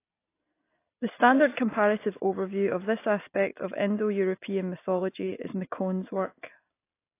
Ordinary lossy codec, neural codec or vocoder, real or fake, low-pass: AAC, 24 kbps; none; real; 3.6 kHz